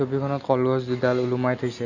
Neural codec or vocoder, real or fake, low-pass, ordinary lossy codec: none; real; 7.2 kHz; none